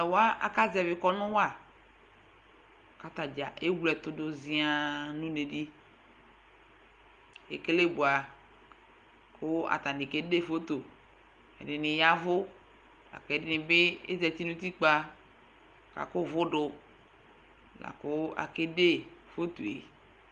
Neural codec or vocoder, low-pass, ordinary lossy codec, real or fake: none; 9.9 kHz; Opus, 32 kbps; real